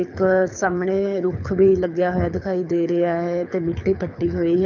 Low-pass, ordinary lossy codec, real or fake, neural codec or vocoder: 7.2 kHz; none; fake; codec, 24 kHz, 6 kbps, HILCodec